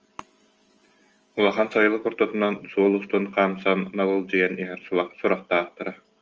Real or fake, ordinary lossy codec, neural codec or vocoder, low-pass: real; Opus, 24 kbps; none; 7.2 kHz